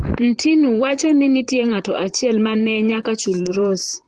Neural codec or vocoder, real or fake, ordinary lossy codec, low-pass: vocoder, 44.1 kHz, 128 mel bands, Pupu-Vocoder; fake; Opus, 16 kbps; 10.8 kHz